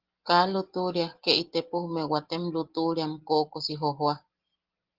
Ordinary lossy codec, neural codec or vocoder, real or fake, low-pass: Opus, 16 kbps; none; real; 5.4 kHz